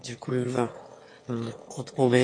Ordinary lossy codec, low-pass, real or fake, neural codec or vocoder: MP3, 48 kbps; 9.9 kHz; fake; autoencoder, 22.05 kHz, a latent of 192 numbers a frame, VITS, trained on one speaker